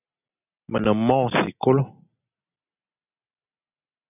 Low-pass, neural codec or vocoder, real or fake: 3.6 kHz; none; real